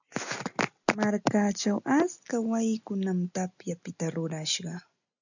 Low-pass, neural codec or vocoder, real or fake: 7.2 kHz; none; real